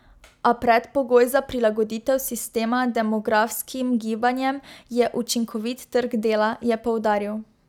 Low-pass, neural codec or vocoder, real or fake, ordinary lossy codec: 19.8 kHz; none; real; none